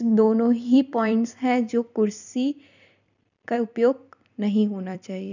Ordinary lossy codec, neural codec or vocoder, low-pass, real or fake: none; vocoder, 44.1 kHz, 128 mel bands every 256 samples, BigVGAN v2; 7.2 kHz; fake